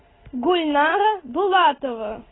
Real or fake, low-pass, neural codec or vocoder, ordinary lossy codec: real; 7.2 kHz; none; AAC, 16 kbps